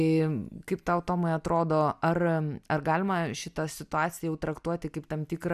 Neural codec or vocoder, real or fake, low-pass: none; real; 14.4 kHz